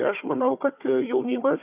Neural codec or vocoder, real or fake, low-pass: codec, 16 kHz, 4 kbps, FunCodec, trained on Chinese and English, 50 frames a second; fake; 3.6 kHz